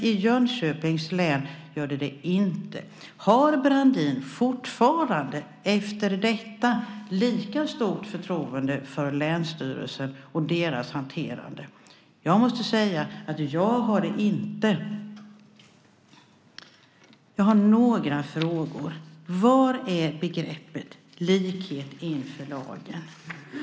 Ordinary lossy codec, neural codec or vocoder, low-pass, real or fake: none; none; none; real